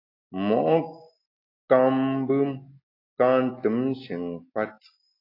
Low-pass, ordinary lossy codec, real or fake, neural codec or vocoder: 5.4 kHz; AAC, 32 kbps; real; none